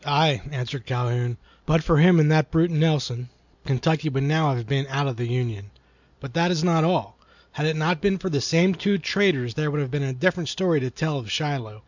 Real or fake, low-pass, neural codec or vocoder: real; 7.2 kHz; none